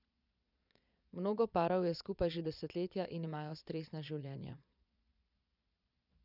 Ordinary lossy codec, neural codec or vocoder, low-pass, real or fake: none; vocoder, 22.05 kHz, 80 mel bands, Vocos; 5.4 kHz; fake